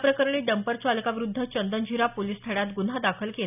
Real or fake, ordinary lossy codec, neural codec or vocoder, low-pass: real; none; none; 3.6 kHz